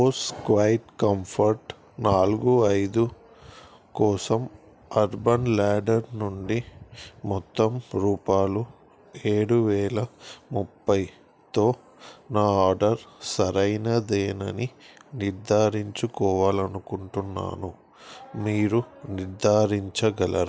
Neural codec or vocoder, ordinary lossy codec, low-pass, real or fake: none; none; none; real